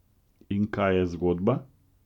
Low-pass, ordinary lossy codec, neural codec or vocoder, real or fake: 19.8 kHz; none; none; real